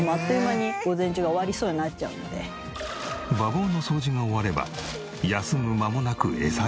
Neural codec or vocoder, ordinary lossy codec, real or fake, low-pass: none; none; real; none